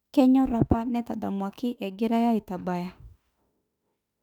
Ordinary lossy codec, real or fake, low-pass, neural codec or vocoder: none; fake; 19.8 kHz; autoencoder, 48 kHz, 32 numbers a frame, DAC-VAE, trained on Japanese speech